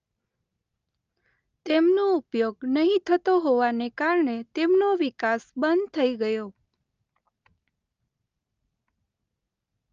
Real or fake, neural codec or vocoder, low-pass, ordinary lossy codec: real; none; 7.2 kHz; Opus, 24 kbps